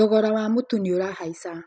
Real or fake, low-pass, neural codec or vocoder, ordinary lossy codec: real; none; none; none